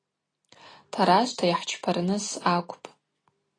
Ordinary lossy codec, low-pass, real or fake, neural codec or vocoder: AAC, 32 kbps; 9.9 kHz; real; none